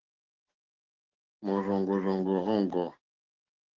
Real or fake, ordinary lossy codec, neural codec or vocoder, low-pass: fake; Opus, 16 kbps; codec, 16 kHz, 6 kbps, DAC; 7.2 kHz